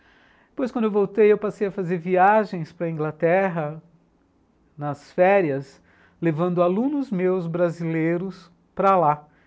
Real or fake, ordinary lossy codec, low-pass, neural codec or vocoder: real; none; none; none